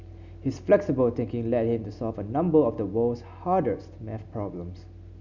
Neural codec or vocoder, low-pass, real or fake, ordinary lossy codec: none; 7.2 kHz; real; none